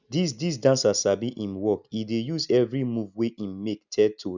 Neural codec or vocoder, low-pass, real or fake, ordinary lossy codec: none; 7.2 kHz; real; none